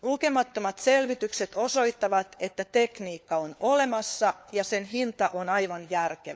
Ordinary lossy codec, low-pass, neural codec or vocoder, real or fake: none; none; codec, 16 kHz, 4 kbps, FunCodec, trained on LibriTTS, 50 frames a second; fake